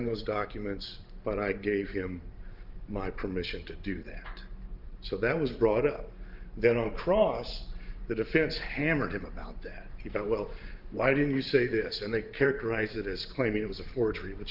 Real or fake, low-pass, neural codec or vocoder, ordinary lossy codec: fake; 5.4 kHz; vocoder, 44.1 kHz, 128 mel bands every 512 samples, BigVGAN v2; Opus, 24 kbps